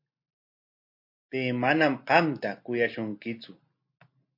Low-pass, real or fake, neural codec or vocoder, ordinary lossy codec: 5.4 kHz; real; none; MP3, 32 kbps